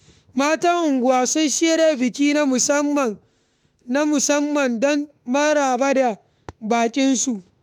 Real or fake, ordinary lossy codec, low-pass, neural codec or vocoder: fake; none; 19.8 kHz; autoencoder, 48 kHz, 32 numbers a frame, DAC-VAE, trained on Japanese speech